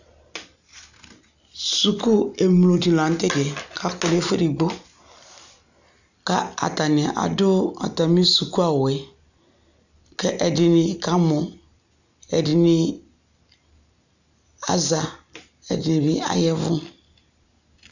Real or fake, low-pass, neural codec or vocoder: real; 7.2 kHz; none